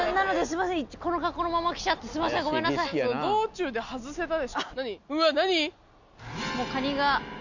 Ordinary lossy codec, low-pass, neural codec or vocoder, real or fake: none; 7.2 kHz; none; real